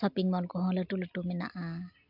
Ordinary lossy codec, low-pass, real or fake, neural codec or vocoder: AAC, 48 kbps; 5.4 kHz; real; none